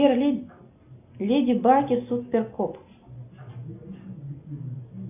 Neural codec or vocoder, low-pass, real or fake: none; 3.6 kHz; real